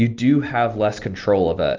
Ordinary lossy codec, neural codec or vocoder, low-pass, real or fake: Opus, 24 kbps; none; 7.2 kHz; real